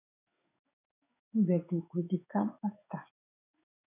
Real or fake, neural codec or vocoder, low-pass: fake; codec, 16 kHz in and 24 kHz out, 1 kbps, XY-Tokenizer; 3.6 kHz